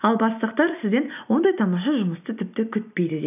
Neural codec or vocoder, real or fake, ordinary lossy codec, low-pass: none; real; none; 3.6 kHz